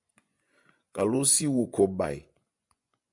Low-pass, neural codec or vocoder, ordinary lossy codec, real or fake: 10.8 kHz; vocoder, 24 kHz, 100 mel bands, Vocos; MP3, 64 kbps; fake